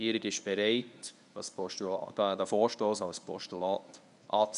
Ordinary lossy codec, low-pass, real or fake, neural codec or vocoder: none; 10.8 kHz; fake; codec, 24 kHz, 0.9 kbps, WavTokenizer, medium speech release version 1